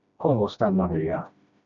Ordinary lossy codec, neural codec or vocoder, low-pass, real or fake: MP3, 96 kbps; codec, 16 kHz, 1 kbps, FreqCodec, smaller model; 7.2 kHz; fake